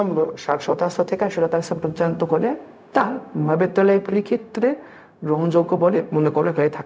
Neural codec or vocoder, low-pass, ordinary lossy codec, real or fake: codec, 16 kHz, 0.4 kbps, LongCat-Audio-Codec; none; none; fake